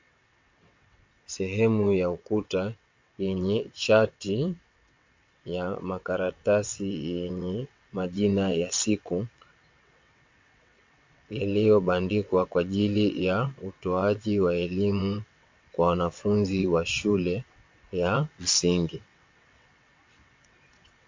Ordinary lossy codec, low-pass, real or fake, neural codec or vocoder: MP3, 48 kbps; 7.2 kHz; fake; vocoder, 22.05 kHz, 80 mel bands, WaveNeXt